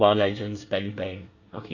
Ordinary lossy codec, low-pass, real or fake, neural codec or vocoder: none; 7.2 kHz; fake; codec, 24 kHz, 1 kbps, SNAC